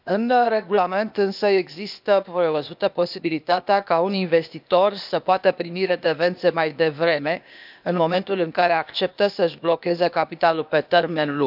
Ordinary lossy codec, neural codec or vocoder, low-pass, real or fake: none; codec, 16 kHz, 0.8 kbps, ZipCodec; 5.4 kHz; fake